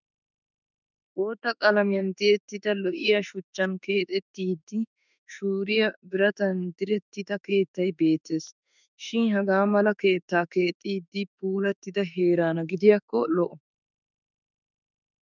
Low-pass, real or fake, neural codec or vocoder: 7.2 kHz; fake; autoencoder, 48 kHz, 32 numbers a frame, DAC-VAE, trained on Japanese speech